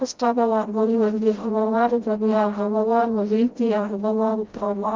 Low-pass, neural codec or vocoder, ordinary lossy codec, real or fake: 7.2 kHz; codec, 16 kHz, 0.5 kbps, FreqCodec, smaller model; Opus, 32 kbps; fake